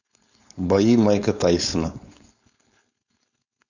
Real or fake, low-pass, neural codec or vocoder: fake; 7.2 kHz; codec, 16 kHz, 4.8 kbps, FACodec